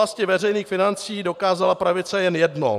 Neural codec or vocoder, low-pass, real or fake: vocoder, 44.1 kHz, 128 mel bands every 512 samples, BigVGAN v2; 14.4 kHz; fake